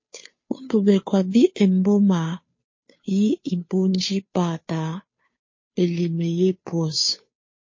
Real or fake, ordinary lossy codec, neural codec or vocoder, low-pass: fake; MP3, 32 kbps; codec, 16 kHz, 2 kbps, FunCodec, trained on Chinese and English, 25 frames a second; 7.2 kHz